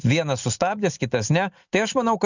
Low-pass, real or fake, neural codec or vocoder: 7.2 kHz; real; none